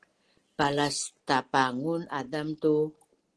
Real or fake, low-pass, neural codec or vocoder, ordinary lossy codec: real; 10.8 kHz; none; Opus, 16 kbps